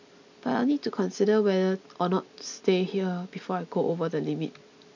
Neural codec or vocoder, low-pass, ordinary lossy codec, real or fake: none; 7.2 kHz; none; real